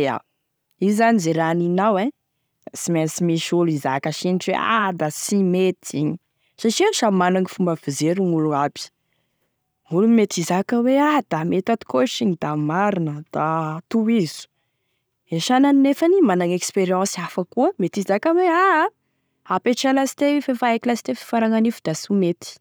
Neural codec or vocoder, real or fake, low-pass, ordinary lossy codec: none; real; none; none